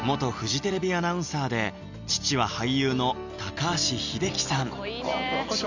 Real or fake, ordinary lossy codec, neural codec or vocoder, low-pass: real; none; none; 7.2 kHz